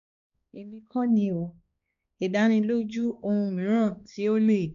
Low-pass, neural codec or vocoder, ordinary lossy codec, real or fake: 7.2 kHz; codec, 16 kHz, 2 kbps, X-Codec, HuBERT features, trained on balanced general audio; none; fake